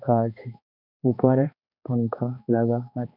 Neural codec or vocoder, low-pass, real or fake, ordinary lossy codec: codec, 16 kHz, 2 kbps, X-Codec, HuBERT features, trained on balanced general audio; 5.4 kHz; fake; none